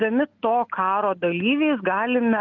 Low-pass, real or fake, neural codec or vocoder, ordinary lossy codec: 7.2 kHz; real; none; Opus, 24 kbps